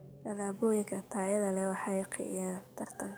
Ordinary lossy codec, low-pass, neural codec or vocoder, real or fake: none; none; codec, 44.1 kHz, 7.8 kbps, DAC; fake